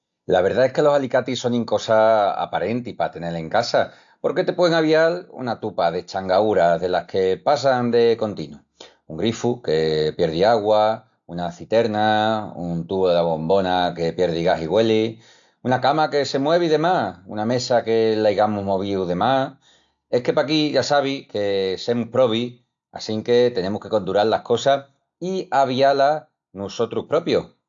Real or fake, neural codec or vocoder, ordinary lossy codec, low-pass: real; none; AAC, 64 kbps; 7.2 kHz